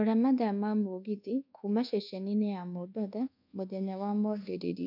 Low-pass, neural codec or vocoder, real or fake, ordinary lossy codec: 5.4 kHz; codec, 24 kHz, 1.2 kbps, DualCodec; fake; none